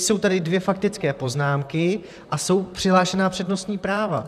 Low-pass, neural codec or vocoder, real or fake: 14.4 kHz; vocoder, 44.1 kHz, 128 mel bands, Pupu-Vocoder; fake